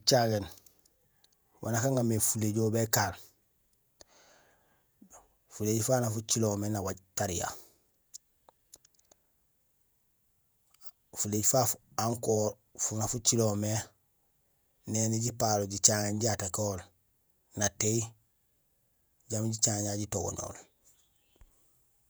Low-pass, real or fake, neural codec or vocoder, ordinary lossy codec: none; fake; vocoder, 48 kHz, 128 mel bands, Vocos; none